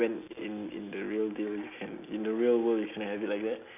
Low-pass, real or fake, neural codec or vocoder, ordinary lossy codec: 3.6 kHz; real; none; none